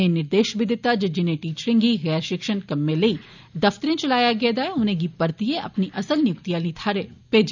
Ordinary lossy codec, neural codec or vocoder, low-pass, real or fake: none; none; 7.2 kHz; real